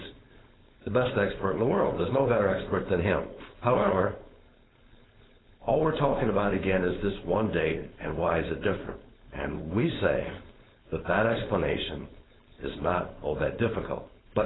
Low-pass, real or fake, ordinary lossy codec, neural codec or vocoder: 7.2 kHz; fake; AAC, 16 kbps; codec, 16 kHz, 4.8 kbps, FACodec